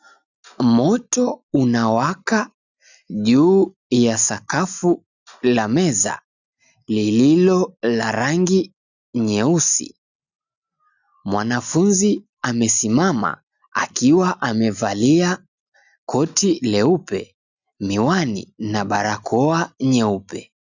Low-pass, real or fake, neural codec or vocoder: 7.2 kHz; real; none